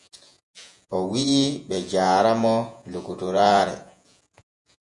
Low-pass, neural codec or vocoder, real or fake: 10.8 kHz; vocoder, 48 kHz, 128 mel bands, Vocos; fake